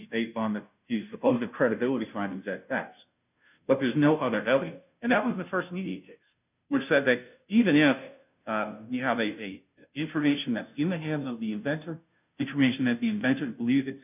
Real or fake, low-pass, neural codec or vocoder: fake; 3.6 kHz; codec, 16 kHz, 0.5 kbps, FunCodec, trained on Chinese and English, 25 frames a second